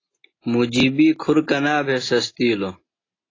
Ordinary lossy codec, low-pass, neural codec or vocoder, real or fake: AAC, 32 kbps; 7.2 kHz; none; real